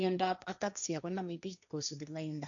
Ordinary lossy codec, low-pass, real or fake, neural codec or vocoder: none; 7.2 kHz; fake; codec, 16 kHz, 1.1 kbps, Voila-Tokenizer